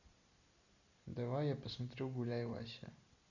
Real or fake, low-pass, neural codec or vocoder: real; 7.2 kHz; none